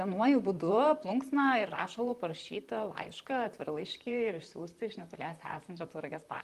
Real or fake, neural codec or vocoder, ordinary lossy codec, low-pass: fake; vocoder, 44.1 kHz, 128 mel bands, Pupu-Vocoder; Opus, 32 kbps; 14.4 kHz